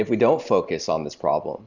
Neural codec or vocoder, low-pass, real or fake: none; 7.2 kHz; real